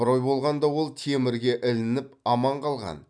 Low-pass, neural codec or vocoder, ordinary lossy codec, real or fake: 9.9 kHz; none; none; real